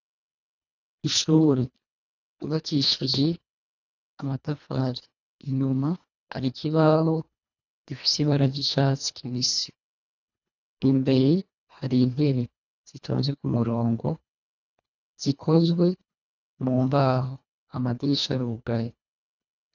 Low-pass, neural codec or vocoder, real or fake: 7.2 kHz; codec, 24 kHz, 1.5 kbps, HILCodec; fake